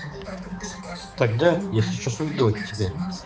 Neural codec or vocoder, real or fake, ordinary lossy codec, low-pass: codec, 16 kHz, 4 kbps, X-Codec, HuBERT features, trained on balanced general audio; fake; none; none